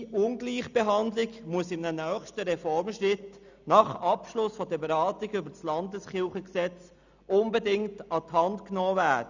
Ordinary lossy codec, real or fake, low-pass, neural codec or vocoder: none; real; 7.2 kHz; none